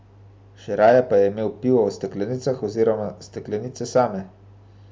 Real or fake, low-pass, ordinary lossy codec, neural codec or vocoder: real; none; none; none